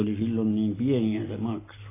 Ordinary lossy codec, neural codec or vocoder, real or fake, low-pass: AAC, 16 kbps; none; real; 3.6 kHz